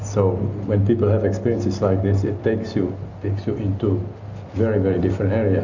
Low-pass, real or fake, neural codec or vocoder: 7.2 kHz; real; none